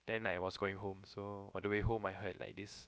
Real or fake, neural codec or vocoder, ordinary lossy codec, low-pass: fake; codec, 16 kHz, about 1 kbps, DyCAST, with the encoder's durations; none; none